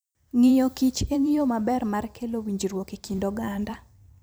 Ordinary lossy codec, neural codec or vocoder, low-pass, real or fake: none; vocoder, 44.1 kHz, 128 mel bands every 256 samples, BigVGAN v2; none; fake